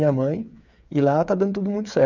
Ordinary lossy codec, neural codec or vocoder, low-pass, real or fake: none; codec, 16 kHz, 8 kbps, FreqCodec, smaller model; 7.2 kHz; fake